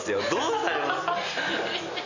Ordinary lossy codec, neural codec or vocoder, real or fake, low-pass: none; none; real; 7.2 kHz